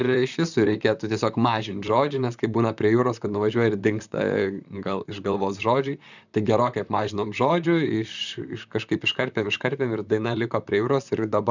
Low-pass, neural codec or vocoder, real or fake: 7.2 kHz; vocoder, 44.1 kHz, 128 mel bands, Pupu-Vocoder; fake